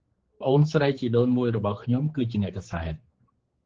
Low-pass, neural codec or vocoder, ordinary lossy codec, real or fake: 7.2 kHz; codec, 16 kHz, 4 kbps, X-Codec, HuBERT features, trained on general audio; Opus, 16 kbps; fake